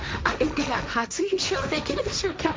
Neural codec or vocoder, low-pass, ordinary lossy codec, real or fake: codec, 16 kHz, 1.1 kbps, Voila-Tokenizer; none; none; fake